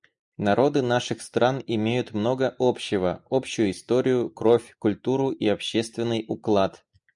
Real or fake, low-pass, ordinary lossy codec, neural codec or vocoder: real; 10.8 kHz; MP3, 96 kbps; none